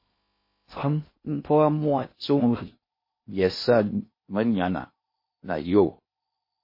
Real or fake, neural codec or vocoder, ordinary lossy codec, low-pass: fake; codec, 16 kHz in and 24 kHz out, 0.6 kbps, FocalCodec, streaming, 2048 codes; MP3, 24 kbps; 5.4 kHz